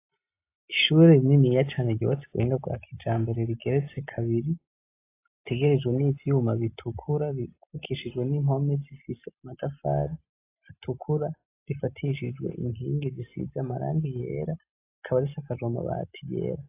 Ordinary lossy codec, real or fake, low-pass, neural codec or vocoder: AAC, 24 kbps; real; 3.6 kHz; none